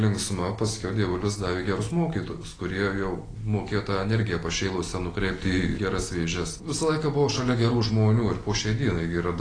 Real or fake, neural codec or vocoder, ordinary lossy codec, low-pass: real; none; AAC, 32 kbps; 9.9 kHz